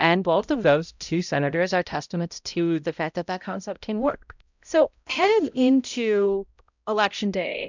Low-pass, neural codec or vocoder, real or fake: 7.2 kHz; codec, 16 kHz, 0.5 kbps, X-Codec, HuBERT features, trained on balanced general audio; fake